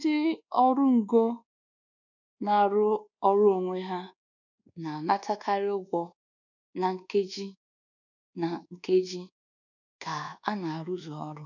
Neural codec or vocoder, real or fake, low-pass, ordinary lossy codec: codec, 24 kHz, 1.2 kbps, DualCodec; fake; 7.2 kHz; none